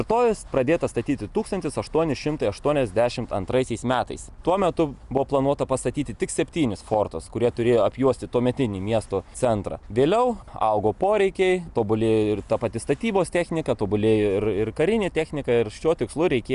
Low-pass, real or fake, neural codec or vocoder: 10.8 kHz; real; none